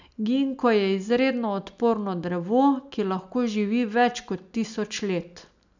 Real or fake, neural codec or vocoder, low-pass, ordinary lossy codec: real; none; 7.2 kHz; none